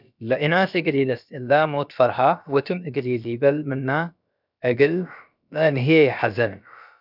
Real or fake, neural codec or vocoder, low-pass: fake; codec, 16 kHz, about 1 kbps, DyCAST, with the encoder's durations; 5.4 kHz